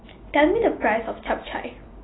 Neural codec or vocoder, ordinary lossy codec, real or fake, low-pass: none; AAC, 16 kbps; real; 7.2 kHz